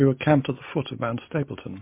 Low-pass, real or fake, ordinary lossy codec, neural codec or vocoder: 3.6 kHz; real; MP3, 24 kbps; none